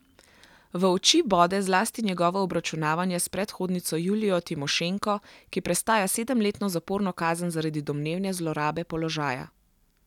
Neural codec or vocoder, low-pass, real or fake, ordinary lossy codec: none; 19.8 kHz; real; none